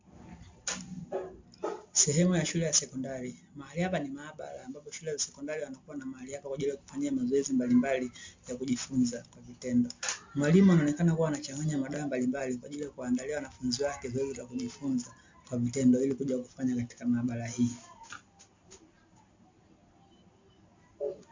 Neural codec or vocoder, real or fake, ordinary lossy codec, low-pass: none; real; MP3, 48 kbps; 7.2 kHz